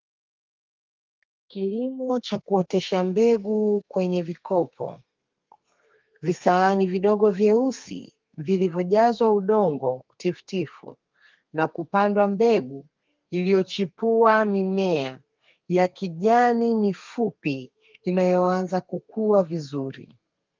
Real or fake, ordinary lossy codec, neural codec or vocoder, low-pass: fake; Opus, 24 kbps; codec, 32 kHz, 1.9 kbps, SNAC; 7.2 kHz